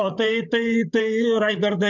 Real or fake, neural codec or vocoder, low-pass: fake; codec, 16 kHz, 8 kbps, FreqCodec, larger model; 7.2 kHz